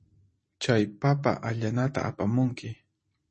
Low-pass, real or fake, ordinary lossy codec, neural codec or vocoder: 10.8 kHz; fake; MP3, 32 kbps; vocoder, 44.1 kHz, 128 mel bands every 512 samples, BigVGAN v2